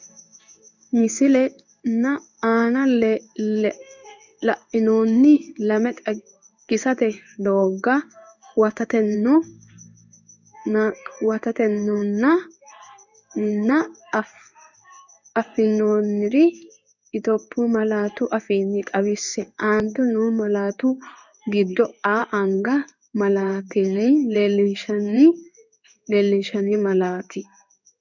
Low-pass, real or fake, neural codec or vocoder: 7.2 kHz; fake; codec, 16 kHz in and 24 kHz out, 1 kbps, XY-Tokenizer